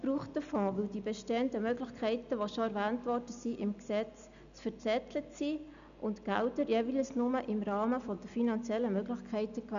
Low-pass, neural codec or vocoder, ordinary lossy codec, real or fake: 7.2 kHz; none; none; real